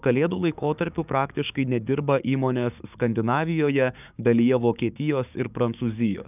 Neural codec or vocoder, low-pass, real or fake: codec, 16 kHz, 6 kbps, DAC; 3.6 kHz; fake